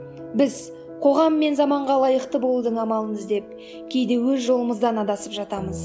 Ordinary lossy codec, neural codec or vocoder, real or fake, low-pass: none; none; real; none